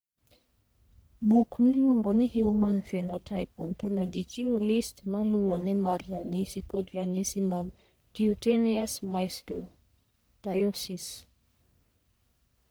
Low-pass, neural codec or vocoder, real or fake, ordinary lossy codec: none; codec, 44.1 kHz, 1.7 kbps, Pupu-Codec; fake; none